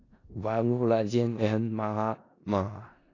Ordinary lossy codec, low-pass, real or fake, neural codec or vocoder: AAC, 32 kbps; 7.2 kHz; fake; codec, 16 kHz in and 24 kHz out, 0.4 kbps, LongCat-Audio-Codec, four codebook decoder